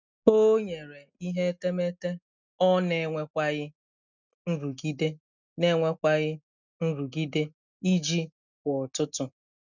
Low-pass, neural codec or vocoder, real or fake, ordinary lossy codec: 7.2 kHz; none; real; none